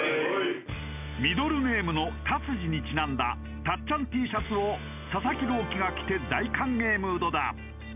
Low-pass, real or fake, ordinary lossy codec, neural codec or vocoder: 3.6 kHz; real; none; none